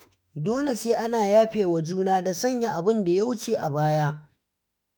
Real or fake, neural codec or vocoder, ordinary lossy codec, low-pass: fake; autoencoder, 48 kHz, 32 numbers a frame, DAC-VAE, trained on Japanese speech; none; none